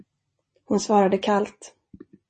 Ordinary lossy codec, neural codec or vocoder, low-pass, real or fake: MP3, 32 kbps; none; 10.8 kHz; real